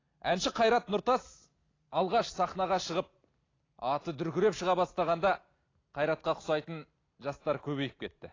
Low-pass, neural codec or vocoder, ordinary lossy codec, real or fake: 7.2 kHz; none; AAC, 32 kbps; real